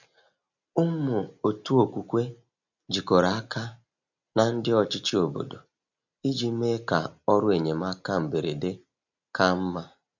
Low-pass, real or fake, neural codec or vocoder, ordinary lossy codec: 7.2 kHz; real; none; none